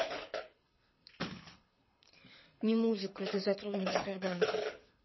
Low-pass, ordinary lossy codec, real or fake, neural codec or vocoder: 7.2 kHz; MP3, 24 kbps; fake; codec, 24 kHz, 6 kbps, HILCodec